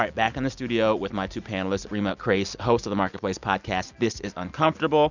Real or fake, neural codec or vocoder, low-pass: real; none; 7.2 kHz